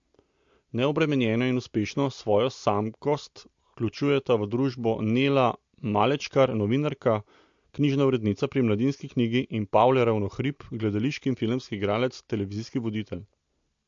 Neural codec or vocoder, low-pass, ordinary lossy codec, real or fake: none; 7.2 kHz; MP3, 48 kbps; real